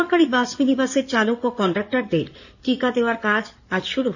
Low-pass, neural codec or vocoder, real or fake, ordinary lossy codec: 7.2 kHz; codec, 16 kHz in and 24 kHz out, 2.2 kbps, FireRedTTS-2 codec; fake; none